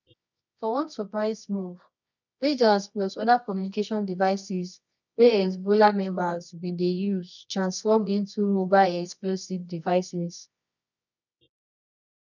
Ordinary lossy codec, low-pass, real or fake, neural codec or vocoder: none; 7.2 kHz; fake; codec, 24 kHz, 0.9 kbps, WavTokenizer, medium music audio release